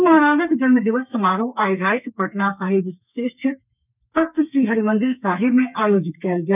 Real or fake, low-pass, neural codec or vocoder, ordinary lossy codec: fake; 3.6 kHz; codec, 32 kHz, 1.9 kbps, SNAC; none